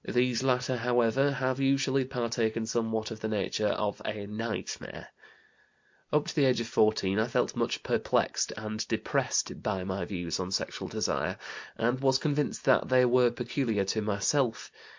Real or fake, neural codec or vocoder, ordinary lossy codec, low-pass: real; none; MP3, 48 kbps; 7.2 kHz